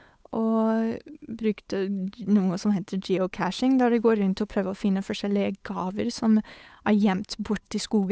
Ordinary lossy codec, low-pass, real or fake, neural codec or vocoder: none; none; fake; codec, 16 kHz, 8 kbps, FunCodec, trained on Chinese and English, 25 frames a second